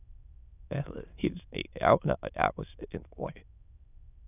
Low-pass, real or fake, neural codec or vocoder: 3.6 kHz; fake; autoencoder, 22.05 kHz, a latent of 192 numbers a frame, VITS, trained on many speakers